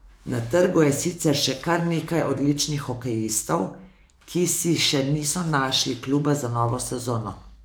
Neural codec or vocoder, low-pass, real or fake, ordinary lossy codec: codec, 44.1 kHz, 7.8 kbps, DAC; none; fake; none